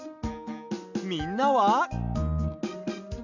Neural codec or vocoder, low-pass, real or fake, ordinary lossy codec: none; 7.2 kHz; real; none